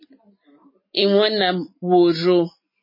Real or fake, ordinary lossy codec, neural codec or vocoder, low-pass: real; MP3, 24 kbps; none; 5.4 kHz